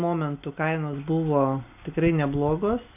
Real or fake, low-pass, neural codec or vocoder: real; 3.6 kHz; none